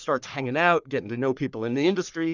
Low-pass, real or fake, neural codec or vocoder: 7.2 kHz; fake; codec, 44.1 kHz, 3.4 kbps, Pupu-Codec